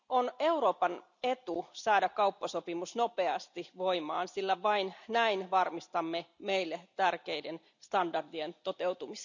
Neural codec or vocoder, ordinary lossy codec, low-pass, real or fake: none; none; 7.2 kHz; real